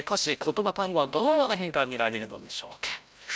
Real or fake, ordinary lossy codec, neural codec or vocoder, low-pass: fake; none; codec, 16 kHz, 0.5 kbps, FreqCodec, larger model; none